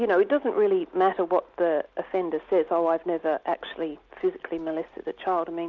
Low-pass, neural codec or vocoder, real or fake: 7.2 kHz; none; real